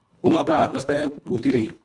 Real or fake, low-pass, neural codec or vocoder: fake; 10.8 kHz; codec, 24 kHz, 1.5 kbps, HILCodec